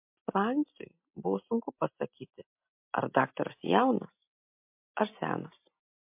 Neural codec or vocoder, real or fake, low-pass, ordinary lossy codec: none; real; 3.6 kHz; MP3, 32 kbps